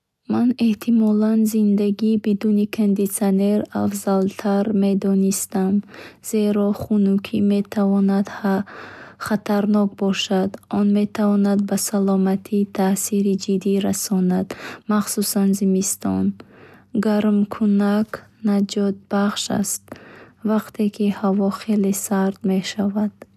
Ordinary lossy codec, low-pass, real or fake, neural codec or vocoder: none; 14.4 kHz; real; none